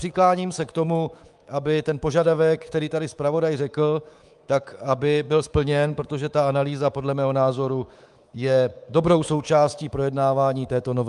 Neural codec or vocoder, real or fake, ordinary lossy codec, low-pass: codec, 24 kHz, 3.1 kbps, DualCodec; fake; Opus, 32 kbps; 10.8 kHz